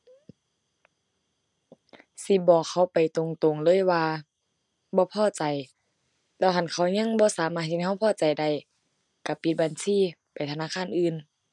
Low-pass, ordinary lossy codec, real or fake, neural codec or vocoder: 10.8 kHz; none; real; none